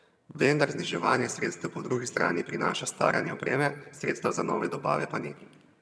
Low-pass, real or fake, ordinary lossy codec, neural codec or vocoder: none; fake; none; vocoder, 22.05 kHz, 80 mel bands, HiFi-GAN